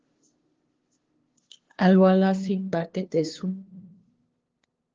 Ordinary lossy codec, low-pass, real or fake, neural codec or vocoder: Opus, 16 kbps; 7.2 kHz; fake; codec, 16 kHz, 2 kbps, FunCodec, trained on LibriTTS, 25 frames a second